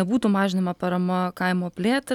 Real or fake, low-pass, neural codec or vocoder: real; 19.8 kHz; none